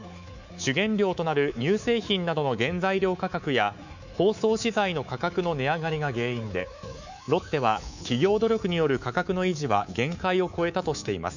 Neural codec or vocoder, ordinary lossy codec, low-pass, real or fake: codec, 24 kHz, 3.1 kbps, DualCodec; none; 7.2 kHz; fake